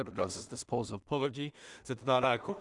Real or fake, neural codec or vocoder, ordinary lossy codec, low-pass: fake; codec, 16 kHz in and 24 kHz out, 0.4 kbps, LongCat-Audio-Codec, two codebook decoder; Opus, 64 kbps; 10.8 kHz